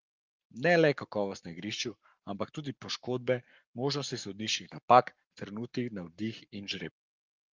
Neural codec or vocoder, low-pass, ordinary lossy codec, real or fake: codec, 44.1 kHz, 7.8 kbps, Pupu-Codec; 7.2 kHz; Opus, 32 kbps; fake